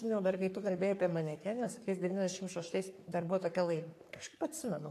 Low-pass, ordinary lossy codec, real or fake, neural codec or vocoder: 14.4 kHz; AAC, 64 kbps; fake; codec, 44.1 kHz, 3.4 kbps, Pupu-Codec